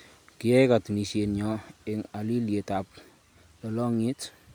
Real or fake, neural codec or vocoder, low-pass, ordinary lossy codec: real; none; none; none